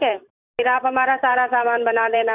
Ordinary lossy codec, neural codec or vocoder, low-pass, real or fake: none; vocoder, 44.1 kHz, 128 mel bands every 512 samples, BigVGAN v2; 3.6 kHz; fake